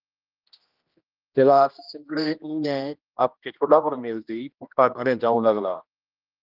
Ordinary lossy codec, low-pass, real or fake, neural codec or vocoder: Opus, 32 kbps; 5.4 kHz; fake; codec, 16 kHz, 1 kbps, X-Codec, HuBERT features, trained on general audio